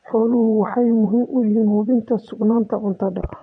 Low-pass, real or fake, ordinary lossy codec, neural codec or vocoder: 9.9 kHz; fake; MP3, 48 kbps; vocoder, 22.05 kHz, 80 mel bands, WaveNeXt